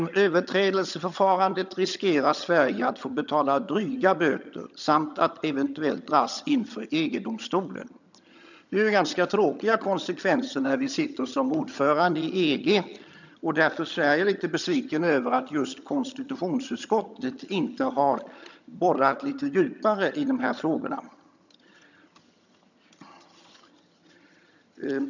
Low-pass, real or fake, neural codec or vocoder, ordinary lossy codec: 7.2 kHz; fake; vocoder, 22.05 kHz, 80 mel bands, HiFi-GAN; none